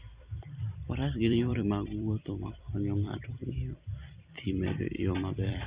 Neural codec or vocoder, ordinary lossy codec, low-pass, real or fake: vocoder, 44.1 kHz, 128 mel bands every 256 samples, BigVGAN v2; Opus, 64 kbps; 3.6 kHz; fake